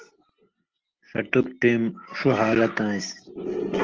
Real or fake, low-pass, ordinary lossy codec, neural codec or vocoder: real; 7.2 kHz; Opus, 16 kbps; none